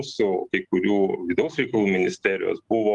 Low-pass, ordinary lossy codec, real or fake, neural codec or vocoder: 10.8 kHz; Opus, 32 kbps; real; none